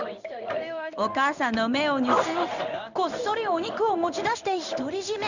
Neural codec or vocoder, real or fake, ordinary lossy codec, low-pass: codec, 16 kHz in and 24 kHz out, 1 kbps, XY-Tokenizer; fake; none; 7.2 kHz